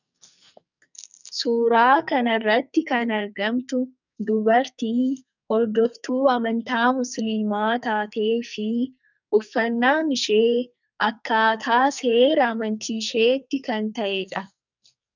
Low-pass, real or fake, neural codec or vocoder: 7.2 kHz; fake; codec, 44.1 kHz, 2.6 kbps, SNAC